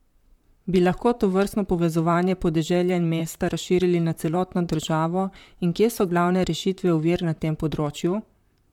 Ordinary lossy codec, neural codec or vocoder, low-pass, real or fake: MP3, 96 kbps; vocoder, 44.1 kHz, 128 mel bands, Pupu-Vocoder; 19.8 kHz; fake